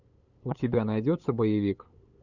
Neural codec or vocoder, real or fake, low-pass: codec, 16 kHz, 8 kbps, FunCodec, trained on LibriTTS, 25 frames a second; fake; 7.2 kHz